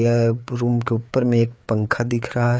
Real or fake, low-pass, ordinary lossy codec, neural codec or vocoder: fake; none; none; codec, 16 kHz, 4 kbps, FreqCodec, larger model